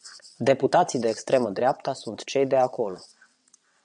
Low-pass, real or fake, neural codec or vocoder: 9.9 kHz; fake; vocoder, 22.05 kHz, 80 mel bands, WaveNeXt